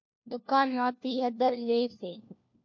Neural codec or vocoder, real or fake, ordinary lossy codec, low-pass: codec, 16 kHz, 1 kbps, FunCodec, trained on LibriTTS, 50 frames a second; fake; MP3, 48 kbps; 7.2 kHz